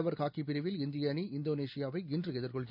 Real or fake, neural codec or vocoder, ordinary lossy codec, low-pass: real; none; none; 5.4 kHz